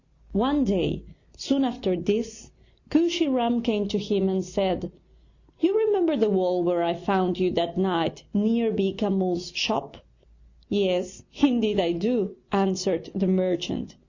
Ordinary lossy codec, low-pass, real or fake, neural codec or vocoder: Opus, 64 kbps; 7.2 kHz; real; none